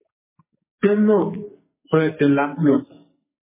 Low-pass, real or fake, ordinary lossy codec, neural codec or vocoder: 3.6 kHz; fake; MP3, 16 kbps; codec, 44.1 kHz, 2.6 kbps, SNAC